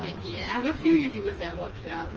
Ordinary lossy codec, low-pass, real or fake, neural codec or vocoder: Opus, 24 kbps; 7.2 kHz; fake; codec, 16 kHz, 2 kbps, FreqCodec, smaller model